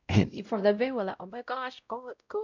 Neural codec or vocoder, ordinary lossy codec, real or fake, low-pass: codec, 16 kHz, 0.5 kbps, X-Codec, WavLM features, trained on Multilingual LibriSpeech; none; fake; 7.2 kHz